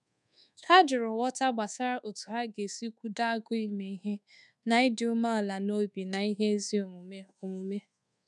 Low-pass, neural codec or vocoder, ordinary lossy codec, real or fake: 10.8 kHz; codec, 24 kHz, 1.2 kbps, DualCodec; none; fake